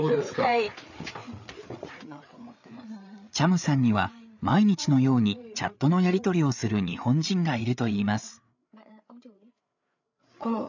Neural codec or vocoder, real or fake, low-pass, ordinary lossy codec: codec, 16 kHz, 8 kbps, FreqCodec, larger model; fake; 7.2 kHz; none